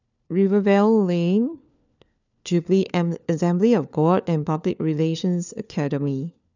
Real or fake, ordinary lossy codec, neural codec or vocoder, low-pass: fake; none; codec, 16 kHz, 4 kbps, FunCodec, trained on LibriTTS, 50 frames a second; 7.2 kHz